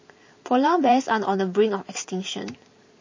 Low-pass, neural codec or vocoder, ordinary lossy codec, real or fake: 7.2 kHz; vocoder, 44.1 kHz, 128 mel bands every 512 samples, BigVGAN v2; MP3, 32 kbps; fake